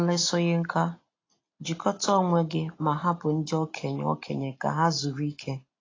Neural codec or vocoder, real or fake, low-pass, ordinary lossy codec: none; real; 7.2 kHz; AAC, 32 kbps